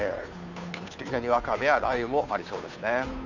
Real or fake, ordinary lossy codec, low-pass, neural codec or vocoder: fake; none; 7.2 kHz; codec, 16 kHz, 2 kbps, FunCodec, trained on Chinese and English, 25 frames a second